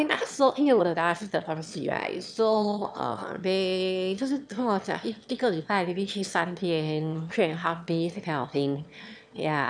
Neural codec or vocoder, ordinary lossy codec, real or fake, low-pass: autoencoder, 22.05 kHz, a latent of 192 numbers a frame, VITS, trained on one speaker; none; fake; 9.9 kHz